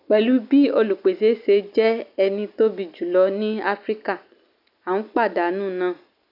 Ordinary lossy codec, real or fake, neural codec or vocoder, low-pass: none; real; none; 5.4 kHz